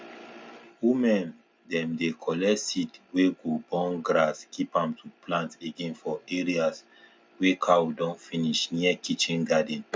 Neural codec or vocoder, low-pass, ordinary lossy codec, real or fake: none; none; none; real